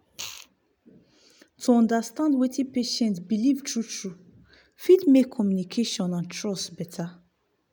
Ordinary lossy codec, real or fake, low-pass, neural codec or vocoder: none; real; none; none